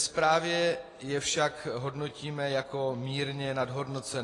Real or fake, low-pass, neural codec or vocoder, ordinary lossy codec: real; 10.8 kHz; none; AAC, 32 kbps